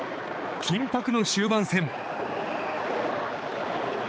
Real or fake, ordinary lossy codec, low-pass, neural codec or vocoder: fake; none; none; codec, 16 kHz, 4 kbps, X-Codec, HuBERT features, trained on balanced general audio